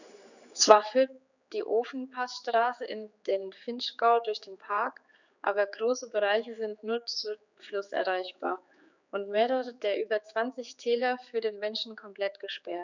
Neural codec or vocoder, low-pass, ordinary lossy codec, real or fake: codec, 16 kHz, 4 kbps, X-Codec, HuBERT features, trained on general audio; 7.2 kHz; none; fake